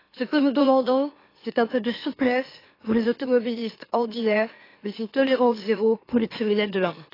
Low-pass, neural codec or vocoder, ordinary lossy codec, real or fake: 5.4 kHz; autoencoder, 44.1 kHz, a latent of 192 numbers a frame, MeloTTS; AAC, 24 kbps; fake